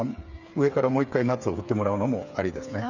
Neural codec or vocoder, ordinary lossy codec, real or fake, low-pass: codec, 16 kHz, 8 kbps, FreqCodec, smaller model; none; fake; 7.2 kHz